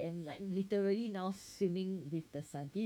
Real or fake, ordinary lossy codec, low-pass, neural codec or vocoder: fake; none; 19.8 kHz; autoencoder, 48 kHz, 32 numbers a frame, DAC-VAE, trained on Japanese speech